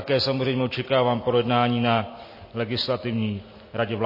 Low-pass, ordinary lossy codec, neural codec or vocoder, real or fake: 5.4 kHz; MP3, 24 kbps; none; real